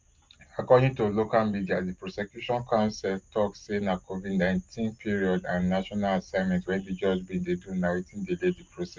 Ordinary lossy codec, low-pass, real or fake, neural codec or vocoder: Opus, 24 kbps; 7.2 kHz; real; none